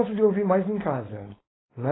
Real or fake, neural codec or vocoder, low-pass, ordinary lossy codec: fake; codec, 16 kHz, 4.8 kbps, FACodec; 7.2 kHz; AAC, 16 kbps